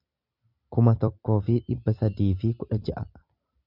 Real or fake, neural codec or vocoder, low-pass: fake; vocoder, 44.1 kHz, 128 mel bands every 256 samples, BigVGAN v2; 5.4 kHz